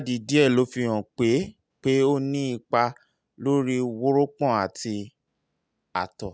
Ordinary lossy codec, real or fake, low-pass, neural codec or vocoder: none; real; none; none